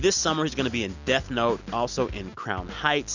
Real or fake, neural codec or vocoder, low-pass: real; none; 7.2 kHz